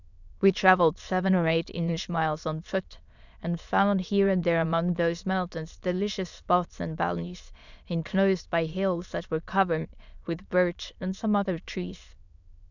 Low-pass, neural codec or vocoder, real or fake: 7.2 kHz; autoencoder, 22.05 kHz, a latent of 192 numbers a frame, VITS, trained on many speakers; fake